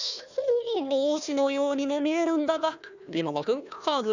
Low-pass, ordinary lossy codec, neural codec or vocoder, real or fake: 7.2 kHz; none; codec, 16 kHz, 1 kbps, FunCodec, trained on Chinese and English, 50 frames a second; fake